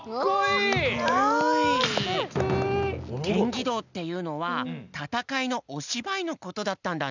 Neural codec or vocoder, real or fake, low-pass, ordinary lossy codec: none; real; 7.2 kHz; none